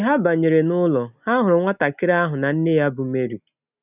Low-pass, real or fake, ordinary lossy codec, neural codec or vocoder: 3.6 kHz; real; none; none